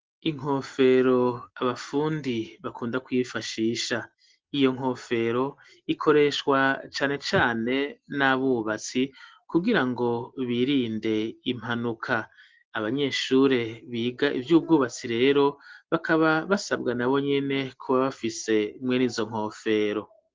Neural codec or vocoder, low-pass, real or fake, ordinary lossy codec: none; 7.2 kHz; real; Opus, 16 kbps